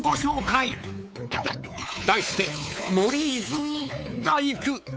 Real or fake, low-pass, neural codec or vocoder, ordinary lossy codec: fake; none; codec, 16 kHz, 4 kbps, X-Codec, WavLM features, trained on Multilingual LibriSpeech; none